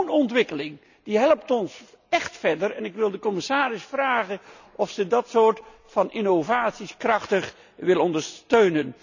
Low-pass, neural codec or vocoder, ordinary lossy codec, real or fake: 7.2 kHz; none; none; real